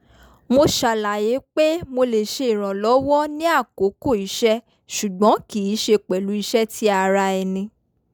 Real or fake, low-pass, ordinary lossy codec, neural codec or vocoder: real; none; none; none